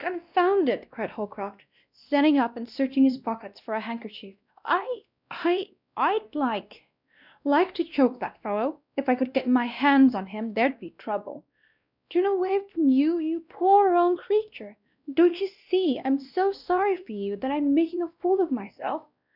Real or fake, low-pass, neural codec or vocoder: fake; 5.4 kHz; codec, 16 kHz, 1 kbps, X-Codec, WavLM features, trained on Multilingual LibriSpeech